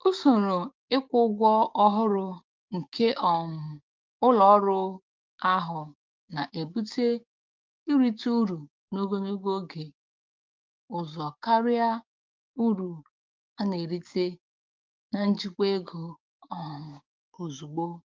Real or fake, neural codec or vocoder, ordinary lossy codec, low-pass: fake; codec, 16 kHz, 6 kbps, DAC; Opus, 32 kbps; 7.2 kHz